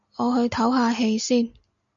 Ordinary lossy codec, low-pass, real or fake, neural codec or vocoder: MP3, 64 kbps; 7.2 kHz; real; none